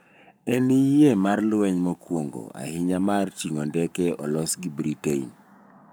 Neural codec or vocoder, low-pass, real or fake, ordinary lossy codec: codec, 44.1 kHz, 7.8 kbps, Pupu-Codec; none; fake; none